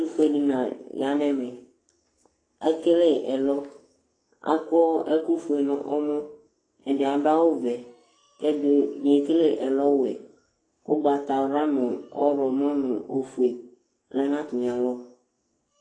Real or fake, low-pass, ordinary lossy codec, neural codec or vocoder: fake; 9.9 kHz; AAC, 32 kbps; codec, 44.1 kHz, 2.6 kbps, SNAC